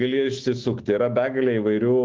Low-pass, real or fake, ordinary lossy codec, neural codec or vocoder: 7.2 kHz; fake; Opus, 16 kbps; autoencoder, 48 kHz, 128 numbers a frame, DAC-VAE, trained on Japanese speech